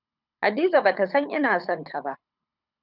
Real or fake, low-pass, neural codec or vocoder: fake; 5.4 kHz; codec, 24 kHz, 6 kbps, HILCodec